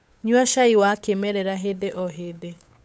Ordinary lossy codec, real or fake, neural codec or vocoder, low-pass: none; fake; codec, 16 kHz, 8 kbps, FunCodec, trained on Chinese and English, 25 frames a second; none